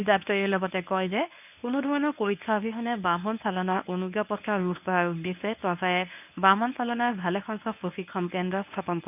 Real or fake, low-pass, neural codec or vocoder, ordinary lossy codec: fake; 3.6 kHz; codec, 24 kHz, 0.9 kbps, WavTokenizer, medium speech release version 1; none